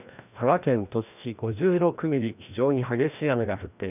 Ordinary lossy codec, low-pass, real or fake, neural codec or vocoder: none; 3.6 kHz; fake; codec, 16 kHz, 1 kbps, FreqCodec, larger model